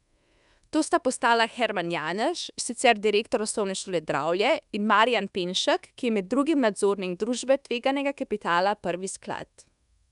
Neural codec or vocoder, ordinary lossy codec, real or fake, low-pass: codec, 24 kHz, 1.2 kbps, DualCodec; none; fake; 10.8 kHz